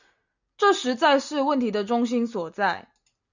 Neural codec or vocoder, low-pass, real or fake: none; 7.2 kHz; real